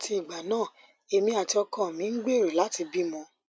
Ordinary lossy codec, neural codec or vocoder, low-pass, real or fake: none; none; none; real